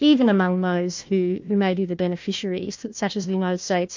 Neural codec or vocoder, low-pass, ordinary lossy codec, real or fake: codec, 16 kHz, 1 kbps, FunCodec, trained on Chinese and English, 50 frames a second; 7.2 kHz; MP3, 48 kbps; fake